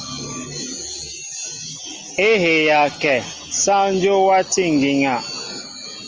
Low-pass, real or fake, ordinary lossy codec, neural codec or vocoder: 7.2 kHz; real; Opus, 24 kbps; none